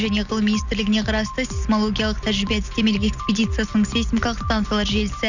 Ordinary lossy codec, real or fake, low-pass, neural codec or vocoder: none; real; 7.2 kHz; none